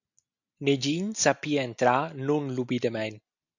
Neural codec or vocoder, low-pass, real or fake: none; 7.2 kHz; real